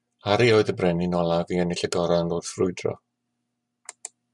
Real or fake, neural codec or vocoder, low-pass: real; none; 10.8 kHz